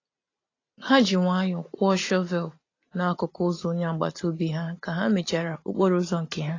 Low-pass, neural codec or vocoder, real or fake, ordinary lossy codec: 7.2 kHz; none; real; AAC, 32 kbps